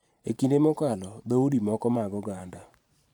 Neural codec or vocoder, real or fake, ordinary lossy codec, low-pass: vocoder, 44.1 kHz, 128 mel bands every 512 samples, BigVGAN v2; fake; none; 19.8 kHz